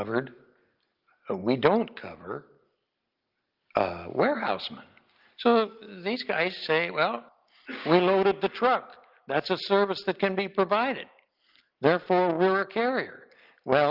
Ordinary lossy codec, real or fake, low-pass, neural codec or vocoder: Opus, 32 kbps; real; 5.4 kHz; none